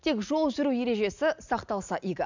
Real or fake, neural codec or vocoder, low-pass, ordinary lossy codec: real; none; 7.2 kHz; none